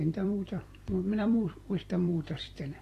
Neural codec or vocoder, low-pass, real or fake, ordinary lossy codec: vocoder, 44.1 kHz, 128 mel bands every 256 samples, BigVGAN v2; 14.4 kHz; fake; AAC, 48 kbps